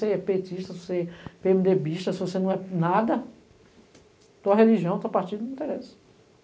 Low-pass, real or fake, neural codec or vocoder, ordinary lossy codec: none; real; none; none